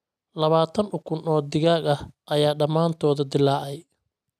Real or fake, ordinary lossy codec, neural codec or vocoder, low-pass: real; none; none; 14.4 kHz